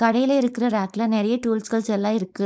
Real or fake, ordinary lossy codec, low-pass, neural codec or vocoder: fake; none; none; codec, 16 kHz, 4.8 kbps, FACodec